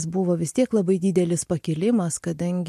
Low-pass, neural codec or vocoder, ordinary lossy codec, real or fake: 14.4 kHz; none; MP3, 64 kbps; real